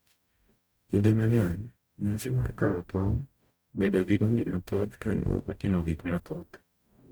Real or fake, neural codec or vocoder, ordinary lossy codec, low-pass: fake; codec, 44.1 kHz, 0.9 kbps, DAC; none; none